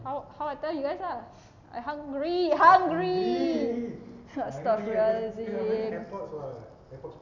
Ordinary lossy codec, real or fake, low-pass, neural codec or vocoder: none; real; 7.2 kHz; none